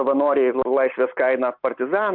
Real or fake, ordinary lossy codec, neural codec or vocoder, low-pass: real; MP3, 48 kbps; none; 5.4 kHz